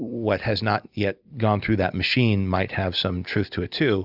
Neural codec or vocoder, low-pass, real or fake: none; 5.4 kHz; real